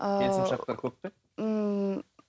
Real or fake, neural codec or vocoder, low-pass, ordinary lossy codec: real; none; none; none